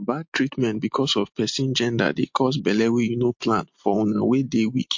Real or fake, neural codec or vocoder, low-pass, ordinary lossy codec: real; none; 7.2 kHz; MP3, 48 kbps